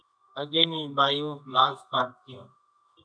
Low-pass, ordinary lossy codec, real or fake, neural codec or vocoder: 9.9 kHz; MP3, 96 kbps; fake; codec, 24 kHz, 0.9 kbps, WavTokenizer, medium music audio release